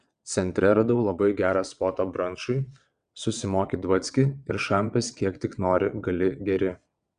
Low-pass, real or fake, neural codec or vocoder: 9.9 kHz; fake; vocoder, 22.05 kHz, 80 mel bands, WaveNeXt